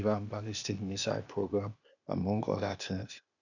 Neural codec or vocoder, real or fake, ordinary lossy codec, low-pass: codec, 16 kHz, 0.8 kbps, ZipCodec; fake; none; 7.2 kHz